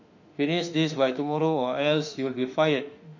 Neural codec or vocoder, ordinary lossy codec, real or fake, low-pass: autoencoder, 48 kHz, 32 numbers a frame, DAC-VAE, trained on Japanese speech; MP3, 48 kbps; fake; 7.2 kHz